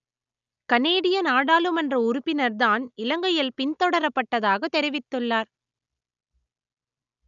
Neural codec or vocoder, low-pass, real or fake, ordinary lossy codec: none; 7.2 kHz; real; none